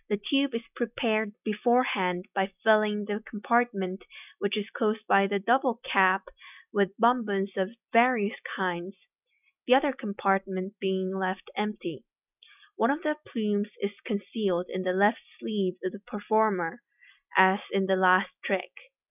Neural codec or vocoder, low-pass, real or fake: none; 3.6 kHz; real